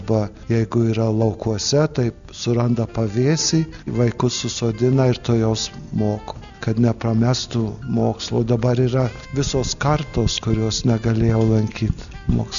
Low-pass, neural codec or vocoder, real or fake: 7.2 kHz; none; real